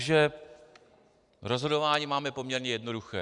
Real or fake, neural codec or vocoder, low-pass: real; none; 10.8 kHz